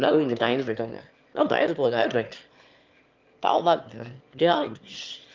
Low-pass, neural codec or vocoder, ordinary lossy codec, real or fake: 7.2 kHz; autoencoder, 22.05 kHz, a latent of 192 numbers a frame, VITS, trained on one speaker; Opus, 24 kbps; fake